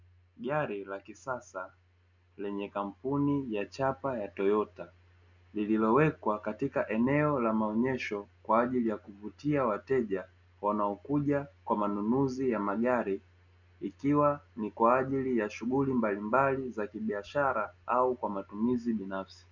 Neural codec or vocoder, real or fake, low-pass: none; real; 7.2 kHz